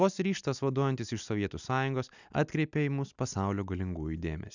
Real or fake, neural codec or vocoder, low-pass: real; none; 7.2 kHz